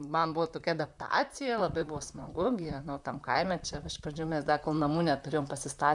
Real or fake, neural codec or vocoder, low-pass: fake; vocoder, 44.1 kHz, 128 mel bands, Pupu-Vocoder; 10.8 kHz